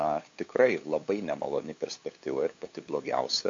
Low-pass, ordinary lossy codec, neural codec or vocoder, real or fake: 7.2 kHz; AAC, 48 kbps; codec, 16 kHz, 8 kbps, FunCodec, trained on LibriTTS, 25 frames a second; fake